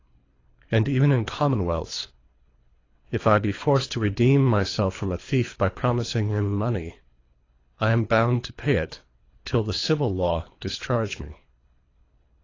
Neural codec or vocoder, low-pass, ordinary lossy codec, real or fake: codec, 24 kHz, 3 kbps, HILCodec; 7.2 kHz; AAC, 32 kbps; fake